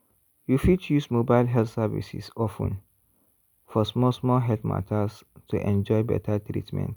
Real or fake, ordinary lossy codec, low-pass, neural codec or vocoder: real; none; none; none